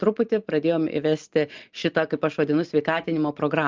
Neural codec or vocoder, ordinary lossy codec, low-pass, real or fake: none; Opus, 32 kbps; 7.2 kHz; real